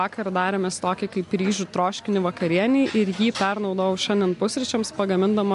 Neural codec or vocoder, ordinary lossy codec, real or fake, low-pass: none; MP3, 64 kbps; real; 10.8 kHz